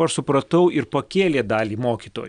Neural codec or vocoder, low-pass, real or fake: none; 9.9 kHz; real